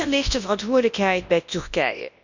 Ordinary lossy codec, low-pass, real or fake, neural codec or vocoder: none; 7.2 kHz; fake; codec, 24 kHz, 0.9 kbps, WavTokenizer, large speech release